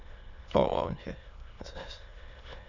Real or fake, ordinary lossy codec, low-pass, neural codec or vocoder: fake; none; 7.2 kHz; autoencoder, 22.05 kHz, a latent of 192 numbers a frame, VITS, trained on many speakers